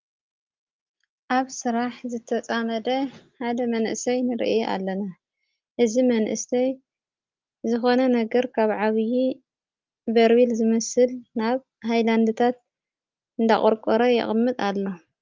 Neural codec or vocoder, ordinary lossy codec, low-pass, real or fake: none; Opus, 32 kbps; 7.2 kHz; real